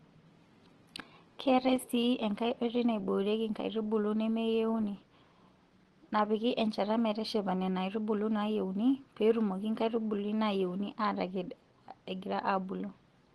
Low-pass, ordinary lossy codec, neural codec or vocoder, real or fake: 10.8 kHz; Opus, 16 kbps; none; real